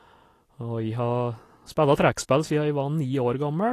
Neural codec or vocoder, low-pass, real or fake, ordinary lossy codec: none; 14.4 kHz; real; AAC, 48 kbps